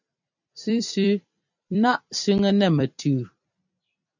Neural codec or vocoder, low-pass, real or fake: vocoder, 44.1 kHz, 128 mel bands every 256 samples, BigVGAN v2; 7.2 kHz; fake